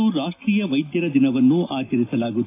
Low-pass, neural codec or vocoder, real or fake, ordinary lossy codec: 3.6 kHz; none; real; AAC, 24 kbps